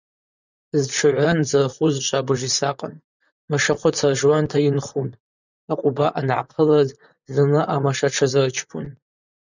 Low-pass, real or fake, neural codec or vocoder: 7.2 kHz; fake; vocoder, 44.1 kHz, 128 mel bands, Pupu-Vocoder